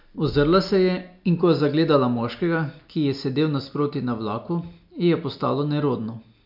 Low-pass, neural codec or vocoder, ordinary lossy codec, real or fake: 5.4 kHz; none; MP3, 48 kbps; real